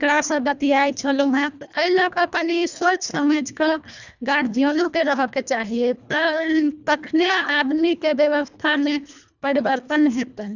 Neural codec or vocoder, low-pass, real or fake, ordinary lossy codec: codec, 24 kHz, 1.5 kbps, HILCodec; 7.2 kHz; fake; none